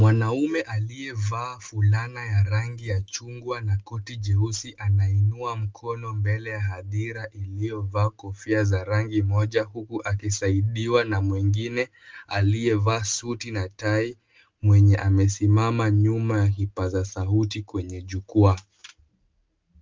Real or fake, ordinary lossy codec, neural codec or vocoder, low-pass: real; Opus, 24 kbps; none; 7.2 kHz